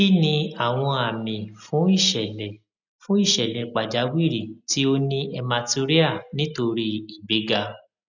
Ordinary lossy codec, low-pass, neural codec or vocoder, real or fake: none; 7.2 kHz; none; real